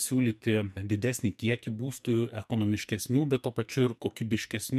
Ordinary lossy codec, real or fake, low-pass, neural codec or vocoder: MP3, 96 kbps; fake; 14.4 kHz; codec, 44.1 kHz, 2.6 kbps, SNAC